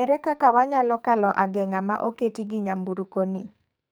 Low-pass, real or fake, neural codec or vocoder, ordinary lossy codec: none; fake; codec, 44.1 kHz, 2.6 kbps, SNAC; none